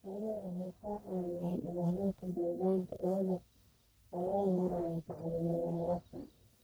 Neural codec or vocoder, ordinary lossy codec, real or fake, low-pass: codec, 44.1 kHz, 1.7 kbps, Pupu-Codec; none; fake; none